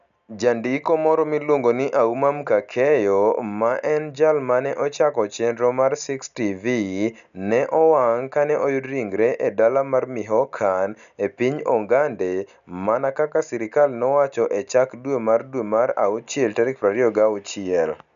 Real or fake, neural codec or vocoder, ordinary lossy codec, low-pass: real; none; none; 7.2 kHz